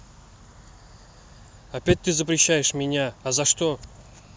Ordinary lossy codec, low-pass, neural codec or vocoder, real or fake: none; none; none; real